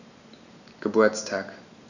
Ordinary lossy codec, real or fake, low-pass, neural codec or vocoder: none; real; 7.2 kHz; none